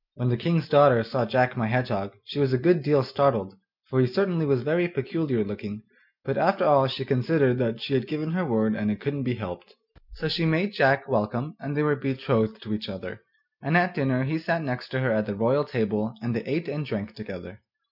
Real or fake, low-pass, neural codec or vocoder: real; 5.4 kHz; none